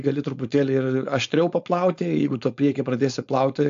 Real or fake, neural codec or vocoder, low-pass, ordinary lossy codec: fake; codec, 16 kHz, 4.8 kbps, FACodec; 7.2 kHz; AAC, 64 kbps